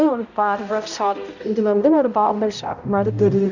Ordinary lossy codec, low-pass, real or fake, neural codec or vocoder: none; 7.2 kHz; fake; codec, 16 kHz, 0.5 kbps, X-Codec, HuBERT features, trained on balanced general audio